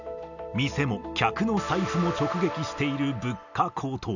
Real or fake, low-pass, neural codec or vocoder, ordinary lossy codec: real; 7.2 kHz; none; none